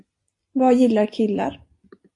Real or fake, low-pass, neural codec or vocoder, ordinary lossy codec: real; 10.8 kHz; none; AAC, 48 kbps